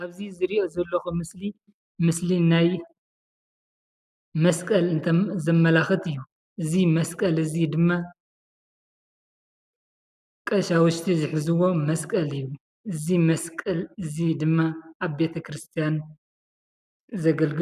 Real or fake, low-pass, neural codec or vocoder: real; 14.4 kHz; none